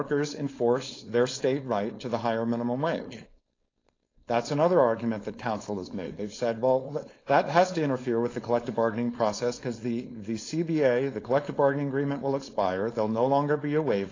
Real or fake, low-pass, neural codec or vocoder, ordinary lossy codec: fake; 7.2 kHz; codec, 16 kHz, 4.8 kbps, FACodec; AAC, 32 kbps